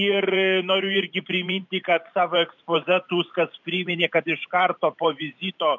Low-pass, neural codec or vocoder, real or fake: 7.2 kHz; vocoder, 44.1 kHz, 128 mel bands every 512 samples, BigVGAN v2; fake